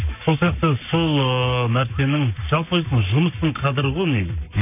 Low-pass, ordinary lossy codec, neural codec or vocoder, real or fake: 3.6 kHz; none; codec, 44.1 kHz, 7.8 kbps, Pupu-Codec; fake